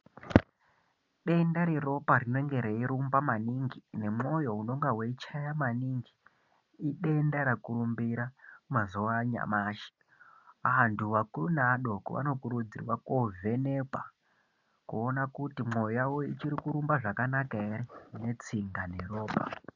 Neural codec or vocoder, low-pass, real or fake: none; 7.2 kHz; real